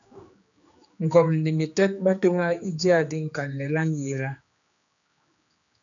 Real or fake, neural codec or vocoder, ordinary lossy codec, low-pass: fake; codec, 16 kHz, 2 kbps, X-Codec, HuBERT features, trained on general audio; MP3, 96 kbps; 7.2 kHz